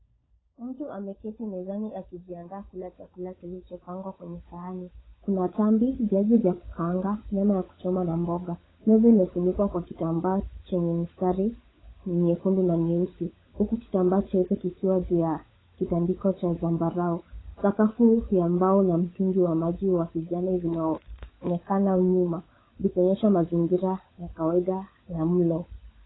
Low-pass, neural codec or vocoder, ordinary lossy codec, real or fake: 7.2 kHz; codec, 16 kHz, 16 kbps, FunCodec, trained on LibriTTS, 50 frames a second; AAC, 16 kbps; fake